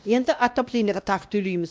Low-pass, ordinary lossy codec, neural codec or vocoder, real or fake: none; none; codec, 16 kHz, 1 kbps, X-Codec, WavLM features, trained on Multilingual LibriSpeech; fake